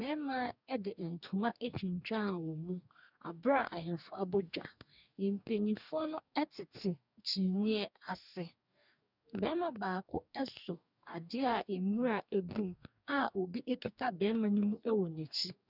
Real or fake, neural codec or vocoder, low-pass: fake; codec, 44.1 kHz, 2.6 kbps, DAC; 5.4 kHz